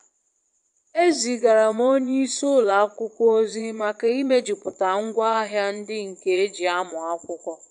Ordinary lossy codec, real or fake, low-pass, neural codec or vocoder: AAC, 96 kbps; fake; 9.9 kHz; vocoder, 22.05 kHz, 80 mel bands, Vocos